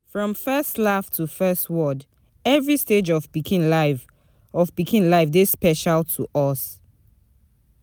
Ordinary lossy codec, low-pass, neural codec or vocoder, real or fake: none; none; none; real